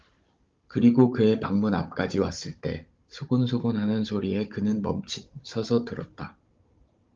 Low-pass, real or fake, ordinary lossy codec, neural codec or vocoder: 7.2 kHz; fake; Opus, 32 kbps; codec, 16 kHz, 16 kbps, FunCodec, trained on Chinese and English, 50 frames a second